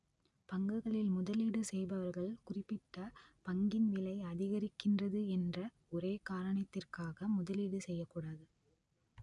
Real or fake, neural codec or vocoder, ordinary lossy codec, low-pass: real; none; none; 10.8 kHz